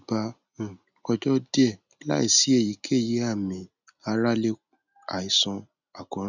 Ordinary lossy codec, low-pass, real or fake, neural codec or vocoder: none; 7.2 kHz; real; none